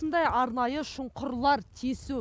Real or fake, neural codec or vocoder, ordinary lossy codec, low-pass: real; none; none; none